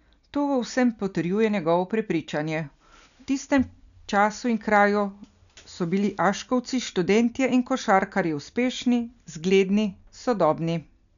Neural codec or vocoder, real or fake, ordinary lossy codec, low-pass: none; real; none; 7.2 kHz